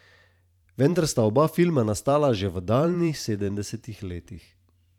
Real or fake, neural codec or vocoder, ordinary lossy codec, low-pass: fake; vocoder, 44.1 kHz, 128 mel bands every 512 samples, BigVGAN v2; none; 19.8 kHz